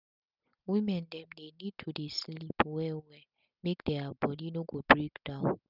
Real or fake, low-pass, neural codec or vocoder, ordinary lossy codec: real; 5.4 kHz; none; none